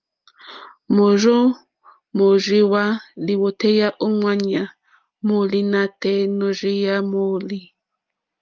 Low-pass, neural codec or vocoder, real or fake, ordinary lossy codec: 7.2 kHz; none; real; Opus, 32 kbps